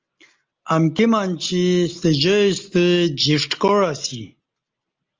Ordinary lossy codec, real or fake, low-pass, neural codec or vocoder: Opus, 32 kbps; real; 7.2 kHz; none